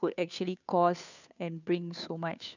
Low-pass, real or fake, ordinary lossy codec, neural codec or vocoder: 7.2 kHz; fake; none; codec, 16 kHz, 2 kbps, FunCodec, trained on Chinese and English, 25 frames a second